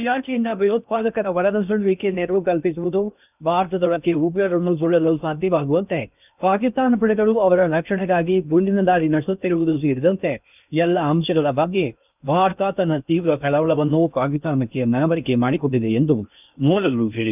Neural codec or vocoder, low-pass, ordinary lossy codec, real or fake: codec, 16 kHz in and 24 kHz out, 0.6 kbps, FocalCodec, streaming, 2048 codes; 3.6 kHz; none; fake